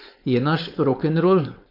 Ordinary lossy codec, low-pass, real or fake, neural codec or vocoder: MP3, 48 kbps; 5.4 kHz; fake; codec, 16 kHz, 4.8 kbps, FACodec